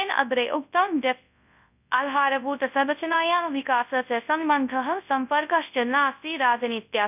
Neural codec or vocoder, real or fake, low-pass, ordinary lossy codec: codec, 24 kHz, 0.9 kbps, WavTokenizer, large speech release; fake; 3.6 kHz; none